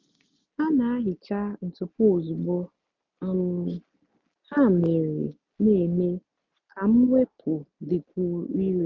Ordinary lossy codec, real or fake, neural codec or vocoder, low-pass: MP3, 48 kbps; real; none; 7.2 kHz